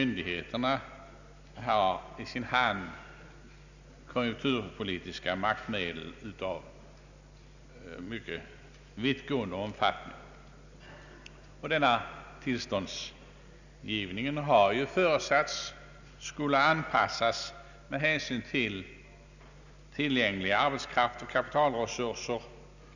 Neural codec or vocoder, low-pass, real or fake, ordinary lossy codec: none; 7.2 kHz; real; none